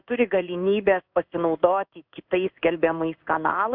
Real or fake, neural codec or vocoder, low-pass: fake; codec, 16 kHz in and 24 kHz out, 1 kbps, XY-Tokenizer; 5.4 kHz